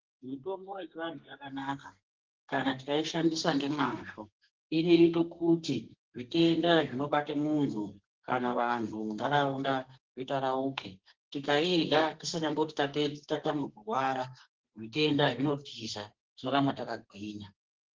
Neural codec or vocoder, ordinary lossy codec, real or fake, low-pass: codec, 32 kHz, 1.9 kbps, SNAC; Opus, 16 kbps; fake; 7.2 kHz